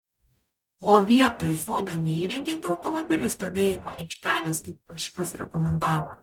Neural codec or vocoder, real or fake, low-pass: codec, 44.1 kHz, 0.9 kbps, DAC; fake; 19.8 kHz